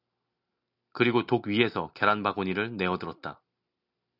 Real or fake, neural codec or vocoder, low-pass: real; none; 5.4 kHz